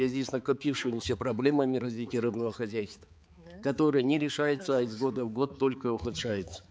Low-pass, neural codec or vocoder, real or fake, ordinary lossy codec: none; codec, 16 kHz, 4 kbps, X-Codec, HuBERT features, trained on balanced general audio; fake; none